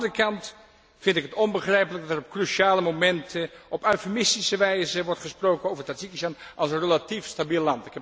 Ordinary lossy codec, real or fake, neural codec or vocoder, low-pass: none; real; none; none